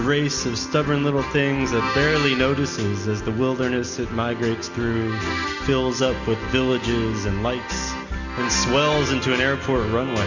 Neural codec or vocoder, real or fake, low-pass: none; real; 7.2 kHz